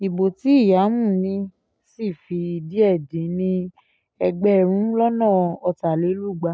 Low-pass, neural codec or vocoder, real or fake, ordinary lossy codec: none; none; real; none